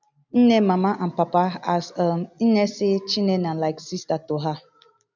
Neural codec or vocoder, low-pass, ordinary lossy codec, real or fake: none; 7.2 kHz; none; real